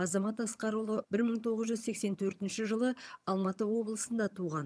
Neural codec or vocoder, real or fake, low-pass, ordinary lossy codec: vocoder, 22.05 kHz, 80 mel bands, HiFi-GAN; fake; none; none